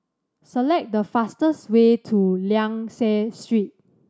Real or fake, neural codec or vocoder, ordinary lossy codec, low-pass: real; none; none; none